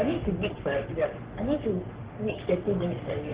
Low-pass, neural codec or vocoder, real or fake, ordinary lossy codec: 3.6 kHz; codec, 44.1 kHz, 3.4 kbps, Pupu-Codec; fake; Opus, 16 kbps